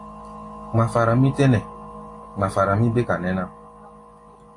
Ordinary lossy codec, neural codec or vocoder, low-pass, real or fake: AAC, 48 kbps; none; 10.8 kHz; real